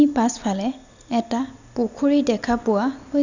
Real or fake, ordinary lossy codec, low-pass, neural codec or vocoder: real; none; 7.2 kHz; none